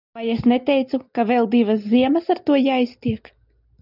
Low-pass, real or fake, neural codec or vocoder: 5.4 kHz; real; none